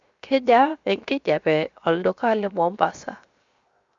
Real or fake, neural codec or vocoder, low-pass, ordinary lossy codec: fake; codec, 16 kHz, 0.7 kbps, FocalCodec; 7.2 kHz; Opus, 64 kbps